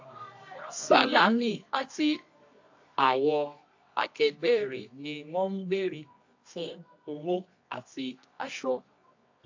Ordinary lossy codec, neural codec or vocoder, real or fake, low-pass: AAC, 48 kbps; codec, 24 kHz, 0.9 kbps, WavTokenizer, medium music audio release; fake; 7.2 kHz